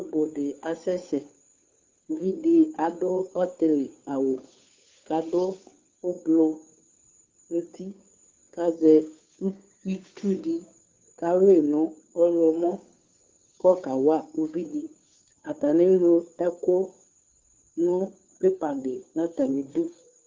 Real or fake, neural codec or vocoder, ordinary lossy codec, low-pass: fake; codec, 16 kHz, 2 kbps, FunCodec, trained on Chinese and English, 25 frames a second; Opus, 32 kbps; 7.2 kHz